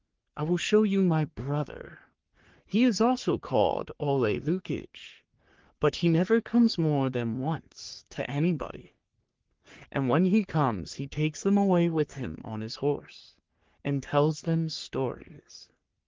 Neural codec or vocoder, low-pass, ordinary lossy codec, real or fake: codec, 44.1 kHz, 3.4 kbps, Pupu-Codec; 7.2 kHz; Opus, 16 kbps; fake